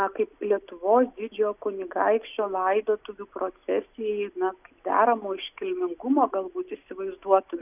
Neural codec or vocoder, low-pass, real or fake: none; 3.6 kHz; real